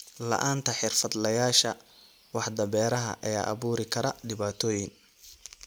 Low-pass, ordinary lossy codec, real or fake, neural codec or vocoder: none; none; real; none